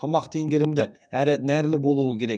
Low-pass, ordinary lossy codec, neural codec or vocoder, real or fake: 9.9 kHz; none; codec, 32 kHz, 1.9 kbps, SNAC; fake